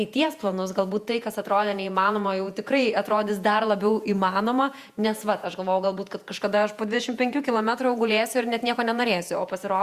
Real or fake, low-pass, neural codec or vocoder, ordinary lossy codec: fake; 14.4 kHz; vocoder, 48 kHz, 128 mel bands, Vocos; Opus, 64 kbps